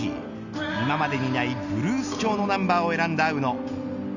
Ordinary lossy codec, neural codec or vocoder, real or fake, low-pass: none; none; real; 7.2 kHz